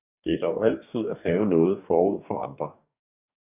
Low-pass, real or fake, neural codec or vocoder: 3.6 kHz; fake; codec, 44.1 kHz, 2.6 kbps, DAC